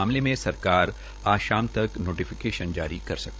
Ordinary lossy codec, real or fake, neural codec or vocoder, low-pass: none; fake; codec, 16 kHz, 16 kbps, FreqCodec, larger model; none